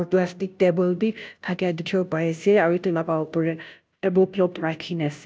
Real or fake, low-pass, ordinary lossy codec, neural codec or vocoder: fake; none; none; codec, 16 kHz, 0.5 kbps, FunCodec, trained on Chinese and English, 25 frames a second